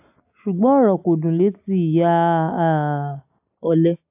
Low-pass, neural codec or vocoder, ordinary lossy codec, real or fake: 3.6 kHz; none; AAC, 32 kbps; real